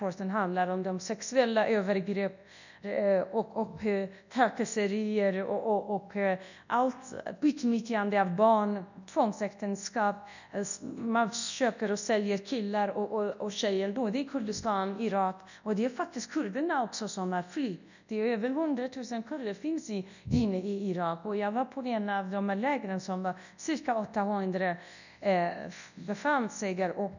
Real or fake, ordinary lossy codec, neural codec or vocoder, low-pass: fake; none; codec, 24 kHz, 0.9 kbps, WavTokenizer, large speech release; 7.2 kHz